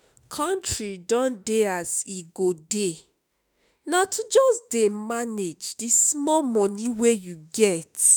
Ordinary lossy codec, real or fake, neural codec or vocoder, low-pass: none; fake; autoencoder, 48 kHz, 32 numbers a frame, DAC-VAE, trained on Japanese speech; none